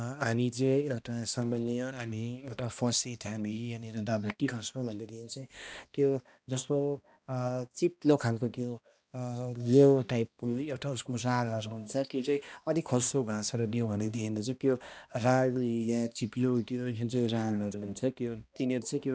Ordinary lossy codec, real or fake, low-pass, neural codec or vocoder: none; fake; none; codec, 16 kHz, 1 kbps, X-Codec, HuBERT features, trained on balanced general audio